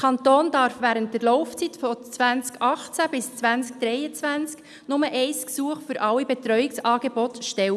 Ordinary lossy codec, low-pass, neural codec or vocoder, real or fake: none; none; none; real